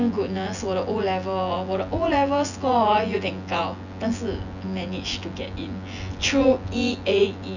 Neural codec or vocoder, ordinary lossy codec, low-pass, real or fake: vocoder, 24 kHz, 100 mel bands, Vocos; none; 7.2 kHz; fake